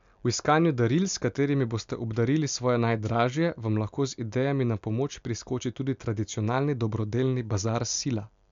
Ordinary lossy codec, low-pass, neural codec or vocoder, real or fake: MP3, 64 kbps; 7.2 kHz; none; real